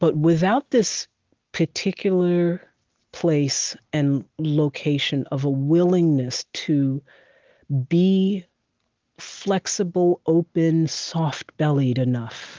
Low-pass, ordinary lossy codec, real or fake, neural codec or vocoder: 7.2 kHz; Opus, 32 kbps; real; none